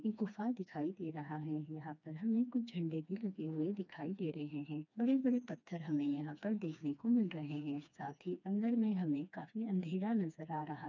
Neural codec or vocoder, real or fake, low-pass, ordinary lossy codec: codec, 16 kHz, 2 kbps, FreqCodec, smaller model; fake; 7.2 kHz; none